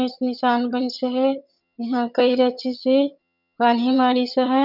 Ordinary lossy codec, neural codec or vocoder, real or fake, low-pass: none; vocoder, 22.05 kHz, 80 mel bands, HiFi-GAN; fake; 5.4 kHz